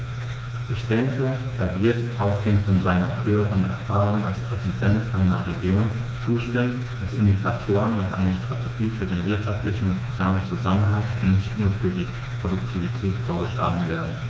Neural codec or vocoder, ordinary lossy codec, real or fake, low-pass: codec, 16 kHz, 2 kbps, FreqCodec, smaller model; none; fake; none